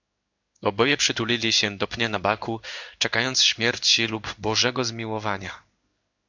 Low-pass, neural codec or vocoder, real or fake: 7.2 kHz; codec, 16 kHz in and 24 kHz out, 1 kbps, XY-Tokenizer; fake